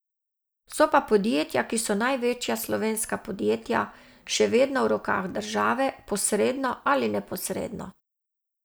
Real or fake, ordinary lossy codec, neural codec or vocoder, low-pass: real; none; none; none